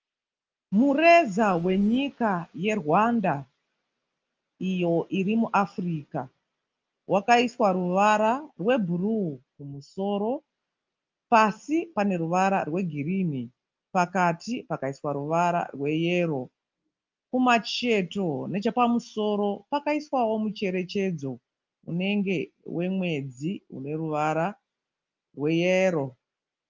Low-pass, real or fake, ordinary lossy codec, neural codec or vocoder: 7.2 kHz; real; Opus, 24 kbps; none